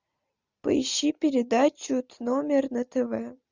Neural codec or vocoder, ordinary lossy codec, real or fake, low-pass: none; Opus, 64 kbps; real; 7.2 kHz